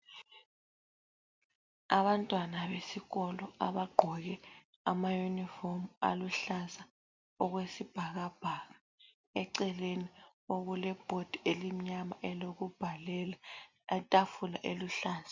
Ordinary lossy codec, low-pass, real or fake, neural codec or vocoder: MP3, 64 kbps; 7.2 kHz; real; none